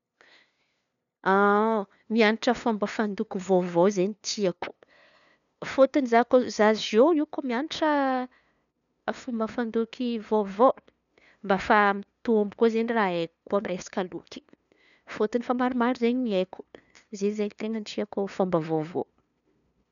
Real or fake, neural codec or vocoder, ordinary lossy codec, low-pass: fake; codec, 16 kHz, 2 kbps, FunCodec, trained on LibriTTS, 25 frames a second; none; 7.2 kHz